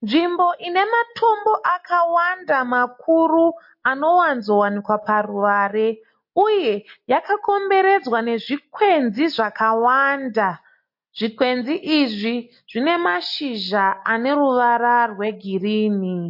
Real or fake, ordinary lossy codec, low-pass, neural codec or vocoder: real; MP3, 32 kbps; 5.4 kHz; none